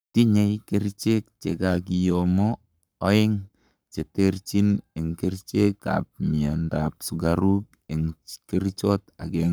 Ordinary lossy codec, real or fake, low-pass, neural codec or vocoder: none; fake; none; codec, 44.1 kHz, 7.8 kbps, Pupu-Codec